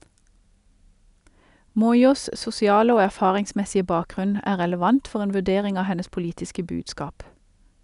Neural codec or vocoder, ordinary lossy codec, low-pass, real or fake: none; none; 10.8 kHz; real